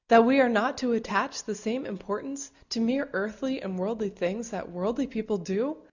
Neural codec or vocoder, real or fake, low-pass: none; real; 7.2 kHz